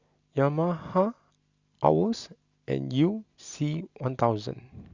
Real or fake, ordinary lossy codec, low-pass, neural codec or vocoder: real; Opus, 64 kbps; 7.2 kHz; none